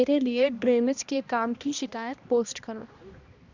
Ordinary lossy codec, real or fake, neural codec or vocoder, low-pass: Opus, 64 kbps; fake; codec, 16 kHz, 1 kbps, X-Codec, HuBERT features, trained on balanced general audio; 7.2 kHz